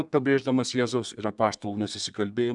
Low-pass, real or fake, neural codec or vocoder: 10.8 kHz; fake; codec, 32 kHz, 1.9 kbps, SNAC